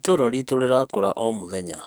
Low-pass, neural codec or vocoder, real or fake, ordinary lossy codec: none; codec, 44.1 kHz, 2.6 kbps, SNAC; fake; none